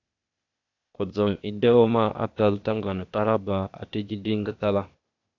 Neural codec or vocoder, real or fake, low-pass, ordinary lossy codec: codec, 16 kHz, 0.8 kbps, ZipCodec; fake; 7.2 kHz; AAC, 48 kbps